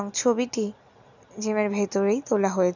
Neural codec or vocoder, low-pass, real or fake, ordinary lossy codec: none; 7.2 kHz; real; none